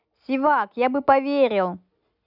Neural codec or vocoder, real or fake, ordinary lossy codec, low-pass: none; real; none; 5.4 kHz